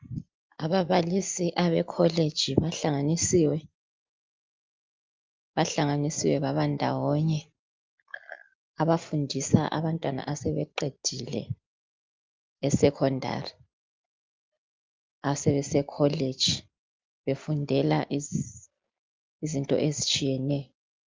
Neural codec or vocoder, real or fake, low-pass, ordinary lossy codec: none; real; 7.2 kHz; Opus, 24 kbps